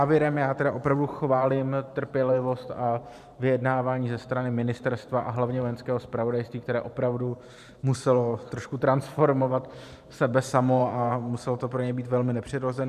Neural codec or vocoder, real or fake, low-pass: vocoder, 44.1 kHz, 128 mel bands every 512 samples, BigVGAN v2; fake; 14.4 kHz